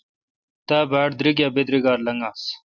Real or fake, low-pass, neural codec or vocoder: real; 7.2 kHz; none